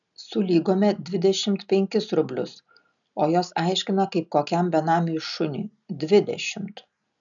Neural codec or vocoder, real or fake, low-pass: none; real; 7.2 kHz